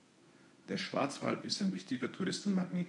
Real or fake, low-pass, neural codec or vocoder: fake; 10.8 kHz; codec, 24 kHz, 0.9 kbps, WavTokenizer, medium speech release version 1